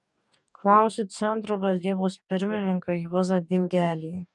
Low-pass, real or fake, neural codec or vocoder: 10.8 kHz; fake; codec, 44.1 kHz, 2.6 kbps, DAC